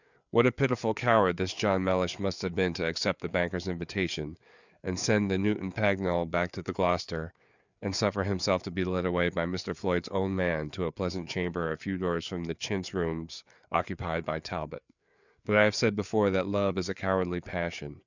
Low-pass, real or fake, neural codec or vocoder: 7.2 kHz; fake; codec, 16 kHz, 4 kbps, FreqCodec, larger model